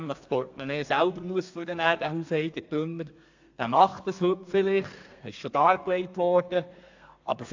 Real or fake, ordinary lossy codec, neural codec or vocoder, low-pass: fake; AAC, 48 kbps; codec, 32 kHz, 1.9 kbps, SNAC; 7.2 kHz